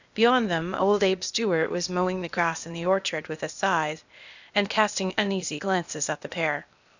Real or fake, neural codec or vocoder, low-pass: fake; codec, 16 kHz, 0.8 kbps, ZipCodec; 7.2 kHz